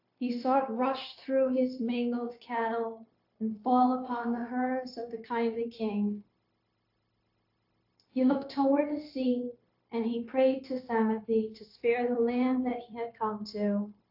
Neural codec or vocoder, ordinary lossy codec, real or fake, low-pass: codec, 16 kHz, 0.9 kbps, LongCat-Audio-Codec; AAC, 48 kbps; fake; 5.4 kHz